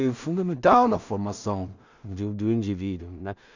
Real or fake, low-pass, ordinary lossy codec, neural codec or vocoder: fake; 7.2 kHz; none; codec, 16 kHz in and 24 kHz out, 0.4 kbps, LongCat-Audio-Codec, two codebook decoder